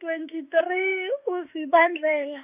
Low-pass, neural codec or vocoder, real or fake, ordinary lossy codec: 3.6 kHz; autoencoder, 48 kHz, 32 numbers a frame, DAC-VAE, trained on Japanese speech; fake; none